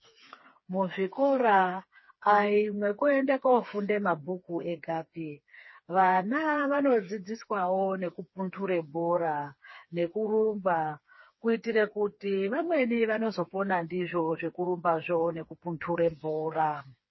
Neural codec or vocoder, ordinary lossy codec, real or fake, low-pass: codec, 16 kHz, 4 kbps, FreqCodec, smaller model; MP3, 24 kbps; fake; 7.2 kHz